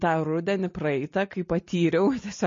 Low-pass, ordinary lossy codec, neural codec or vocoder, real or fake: 7.2 kHz; MP3, 32 kbps; none; real